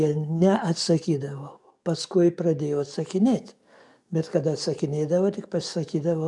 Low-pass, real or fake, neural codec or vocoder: 10.8 kHz; fake; codec, 44.1 kHz, 7.8 kbps, DAC